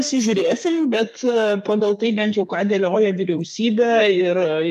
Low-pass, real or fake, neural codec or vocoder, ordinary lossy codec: 14.4 kHz; fake; codec, 32 kHz, 1.9 kbps, SNAC; AAC, 96 kbps